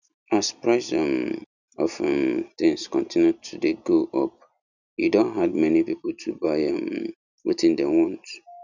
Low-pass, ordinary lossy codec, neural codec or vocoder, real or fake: 7.2 kHz; none; none; real